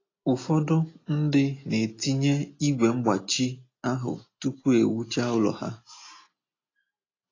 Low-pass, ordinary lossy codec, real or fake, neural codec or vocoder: 7.2 kHz; AAC, 32 kbps; real; none